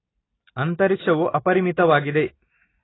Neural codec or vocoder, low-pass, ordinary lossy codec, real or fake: none; 7.2 kHz; AAC, 16 kbps; real